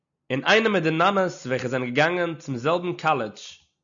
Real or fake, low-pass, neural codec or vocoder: real; 7.2 kHz; none